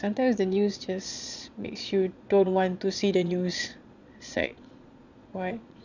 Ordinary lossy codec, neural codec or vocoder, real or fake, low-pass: none; vocoder, 22.05 kHz, 80 mel bands, Vocos; fake; 7.2 kHz